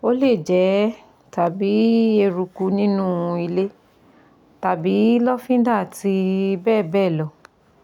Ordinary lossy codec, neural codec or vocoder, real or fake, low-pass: none; none; real; 19.8 kHz